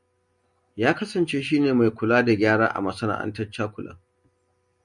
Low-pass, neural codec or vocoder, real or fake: 10.8 kHz; none; real